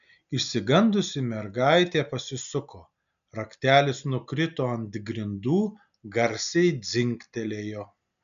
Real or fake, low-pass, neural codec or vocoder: real; 7.2 kHz; none